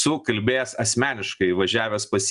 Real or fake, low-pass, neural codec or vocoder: real; 10.8 kHz; none